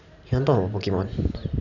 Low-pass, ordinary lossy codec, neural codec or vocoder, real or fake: 7.2 kHz; none; none; real